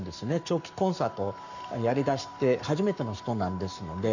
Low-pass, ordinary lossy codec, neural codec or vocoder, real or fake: 7.2 kHz; none; codec, 44.1 kHz, 7.8 kbps, Pupu-Codec; fake